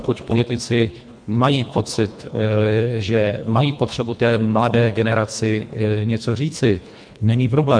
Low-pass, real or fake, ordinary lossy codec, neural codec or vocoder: 9.9 kHz; fake; MP3, 64 kbps; codec, 24 kHz, 1.5 kbps, HILCodec